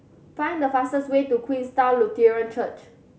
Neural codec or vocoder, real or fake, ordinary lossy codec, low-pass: none; real; none; none